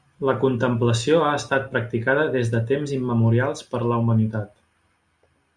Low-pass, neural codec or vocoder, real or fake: 9.9 kHz; none; real